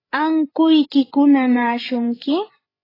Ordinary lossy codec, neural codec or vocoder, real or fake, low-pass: AAC, 24 kbps; codec, 16 kHz, 16 kbps, FreqCodec, larger model; fake; 5.4 kHz